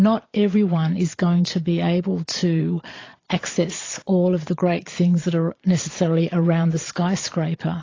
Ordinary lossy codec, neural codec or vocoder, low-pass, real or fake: AAC, 32 kbps; vocoder, 22.05 kHz, 80 mel bands, Vocos; 7.2 kHz; fake